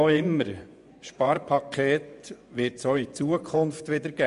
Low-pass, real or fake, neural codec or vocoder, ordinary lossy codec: 10.8 kHz; fake; vocoder, 24 kHz, 100 mel bands, Vocos; none